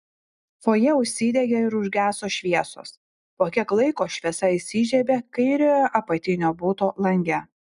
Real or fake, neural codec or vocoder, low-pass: real; none; 10.8 kHz